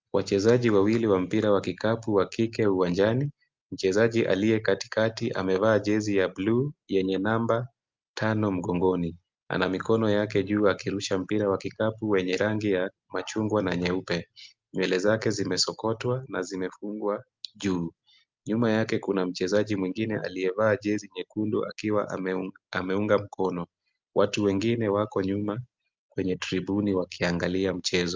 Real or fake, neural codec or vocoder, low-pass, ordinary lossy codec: real; none; 7.2 kHz; Opus, 24 kbps